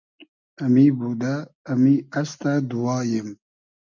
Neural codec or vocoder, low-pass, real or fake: none; 7.2 kHz; real